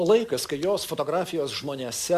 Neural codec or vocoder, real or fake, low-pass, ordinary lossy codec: none; real; 14.4 kHz; Opus, 64 kbps